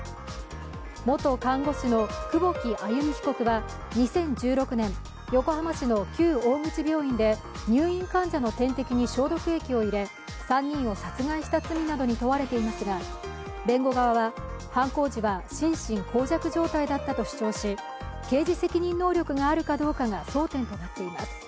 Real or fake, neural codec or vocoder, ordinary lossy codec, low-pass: real; none; none; none